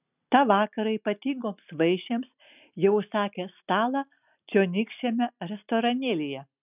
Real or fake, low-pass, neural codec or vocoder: real; 3.6 kHz; none